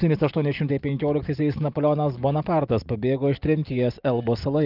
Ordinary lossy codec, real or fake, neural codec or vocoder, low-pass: Opus, 32 kbps; real; none; 5.4 kHz